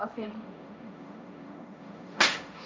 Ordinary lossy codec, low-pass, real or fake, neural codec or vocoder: none; none; fake; codec, 16 kHz, 1.1 kbps, Voila-Tokenizer